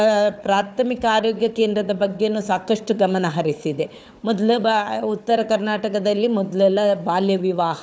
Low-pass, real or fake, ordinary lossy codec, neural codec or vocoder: none; fake; none; codec, 16 kHz, 4 kbps, FunCodec, trained on Chinese and English, 50 frames a second